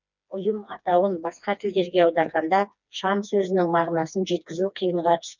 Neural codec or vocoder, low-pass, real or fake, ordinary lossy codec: codec, 16 kHz, 2 kbps, FreqCodec, smaller model; 7.2 kHz; fake; none